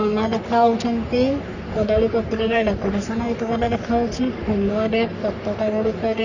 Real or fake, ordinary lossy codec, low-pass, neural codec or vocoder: fake; none; 7.2 kHz; codec, 44.1 kHz, 3.4 kbps, Pupu-Codec